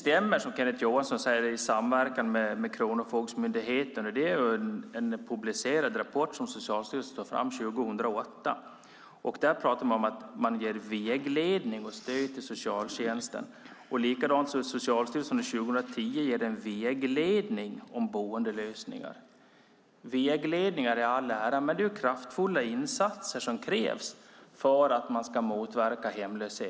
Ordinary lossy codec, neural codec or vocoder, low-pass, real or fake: none; none; none; real